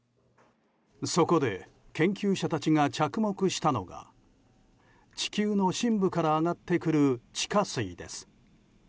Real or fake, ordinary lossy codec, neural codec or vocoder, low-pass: real; none; none; none